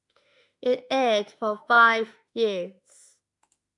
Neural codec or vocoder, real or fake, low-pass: autoencoder, 48 kHz, 32 numbers a frame, DAC-VAE, trained on Japanese speech; fake; 10.8 kHz